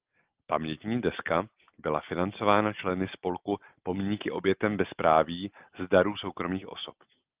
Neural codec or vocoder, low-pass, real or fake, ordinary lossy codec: none; 3.6 kHz; real; Opus, 32 kbps